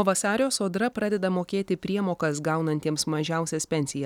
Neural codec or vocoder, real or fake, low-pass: none; real; 19.8 kHz